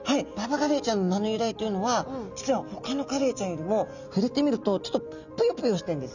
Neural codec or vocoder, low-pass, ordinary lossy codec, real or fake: none; 7.2 kHz; none; real